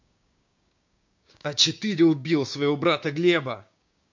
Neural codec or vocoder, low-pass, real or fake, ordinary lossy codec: codec, 16 kHz, 6 kbps, DAC; 7.2 kHz; fake; MP3, 48 kbps